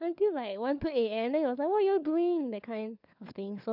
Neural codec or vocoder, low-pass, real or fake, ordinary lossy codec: codec, 16 kHz, 4 kbps, FunCodec, trained on LibriTTS, 50 frames a second; 5.4 kHz; fake; none